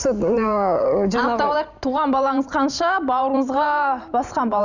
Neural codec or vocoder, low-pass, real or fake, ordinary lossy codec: vocoder, 44.1 kHz, 128 mel bands every 512 samples, BigVGAN v2; 7.2 kHz; fake; none